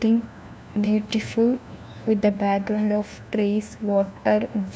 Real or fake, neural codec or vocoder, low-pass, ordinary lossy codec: fake; codec, 16 kHz, 1 kbps, FunCodec, trained on LibriTTS, 50 frames a second; none; none